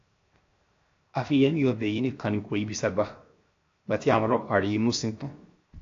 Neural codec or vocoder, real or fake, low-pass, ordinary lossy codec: codec, 16 kHz, 0.7 kbps, FocalCodec; fake; 7.2 kHz; AAC, 48 kbps